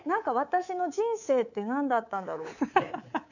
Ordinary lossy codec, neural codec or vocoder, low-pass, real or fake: none; codec, 24 kHz, 3.1 kbps, DualCodec; 7.2 kHz; fake